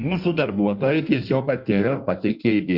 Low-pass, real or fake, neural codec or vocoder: 5.4 kHz; fake; codec, 16 kHz in and 24 kHz out, 1.1 kbps, FireRedTTS-2 codec